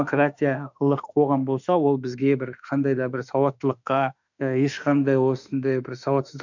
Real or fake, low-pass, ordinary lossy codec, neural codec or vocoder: fake; 7.2 kHz; none; autoencoder, 48 kHz, 32 numbers a frame, DAC-VAE, trained on Japanese speech